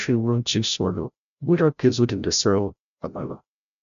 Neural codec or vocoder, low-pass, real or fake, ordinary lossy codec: codec, 16 kHz, 0.5 kbps, FreqCodec, larger model; 7.2 kHz; fake; none